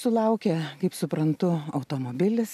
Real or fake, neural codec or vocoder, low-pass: real; none; 14.4 kHz